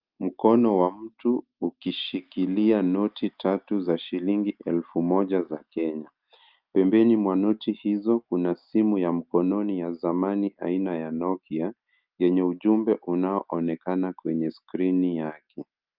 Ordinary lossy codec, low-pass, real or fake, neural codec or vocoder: Opus, 24 kbps; 5.4 kHz; real; none